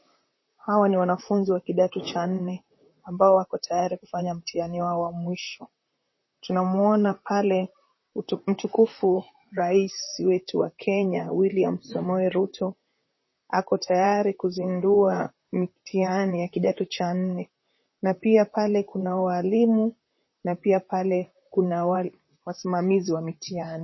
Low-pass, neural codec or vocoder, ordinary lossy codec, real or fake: 7.2 kHz; vocoder, 44.1 kHz, 128 mel bands, Pupu-Vocoder; MP3, 24 kbps; fake